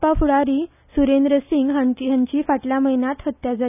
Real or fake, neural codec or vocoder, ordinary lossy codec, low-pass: real; none; none; 3.6 kHz